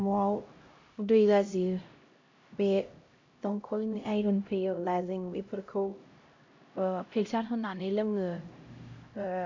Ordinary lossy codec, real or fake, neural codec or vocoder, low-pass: MP3, 48 kbps; fake; codec, 16 kHz, 0.5 kbps, X-Codec, HuBERT features, trained on LibriSpeech; 7.2 kHz